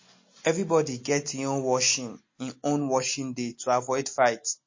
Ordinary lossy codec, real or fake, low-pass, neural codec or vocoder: MP3, 32 kbps; real; 7.2 kHz; none